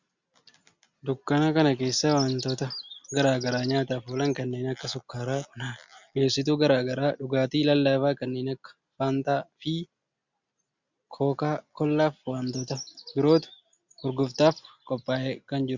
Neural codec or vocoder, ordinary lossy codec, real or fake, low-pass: none; Opus, 64 kbps; real; 7.2 kHz